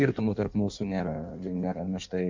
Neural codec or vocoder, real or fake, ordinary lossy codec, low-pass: codec, 16 kHz in and 24 kHz out, 1.1 kbps, FireRedTTS-2 codec; fake; MP3, 64 kbps; 7.2 kHz